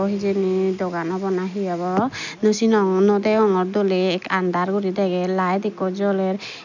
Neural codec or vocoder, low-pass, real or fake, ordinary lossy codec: none; 7.2 kHz; real; none